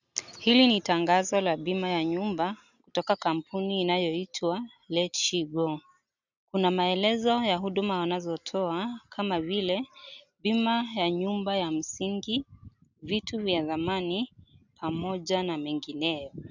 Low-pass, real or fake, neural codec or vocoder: 7.2 kHz; real; none